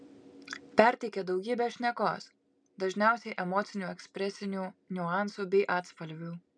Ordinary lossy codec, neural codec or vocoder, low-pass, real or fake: MP3, 96 kbps; none; 9.9 kHz; real